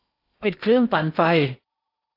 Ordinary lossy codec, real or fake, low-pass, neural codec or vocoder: AAC, 32 kbps; fake; 5.4 kHz; codec, 16 kHz in and 24 kHz out, 0.8 kbps, FocalCodec, streaming, 65536 codes